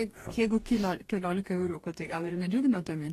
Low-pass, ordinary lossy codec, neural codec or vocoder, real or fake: 14.4 kHz; AAC, 48 kbps; codec, 44.1 kHz, 2.6 kbps, DAC; fake